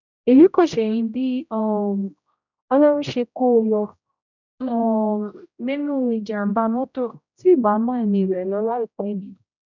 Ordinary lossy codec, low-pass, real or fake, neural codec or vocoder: none; 7.2 kHz; fake; codec, 16 kHz, 0.5 kbps, X-Codec, HuBERT features, trained on general audio